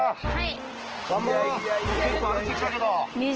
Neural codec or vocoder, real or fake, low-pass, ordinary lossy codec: none; real; 7.2 kHz; Opus, 16 kbps